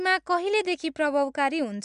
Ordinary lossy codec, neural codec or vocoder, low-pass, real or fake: MP3, 96 kbps; none; 9.9 kHz; real